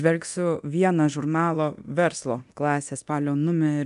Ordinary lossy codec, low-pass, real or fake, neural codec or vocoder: MP3, 96 kbps; 10.8 kHz; fake; codec, 24 kHz, 0.9 kbps, DualCodec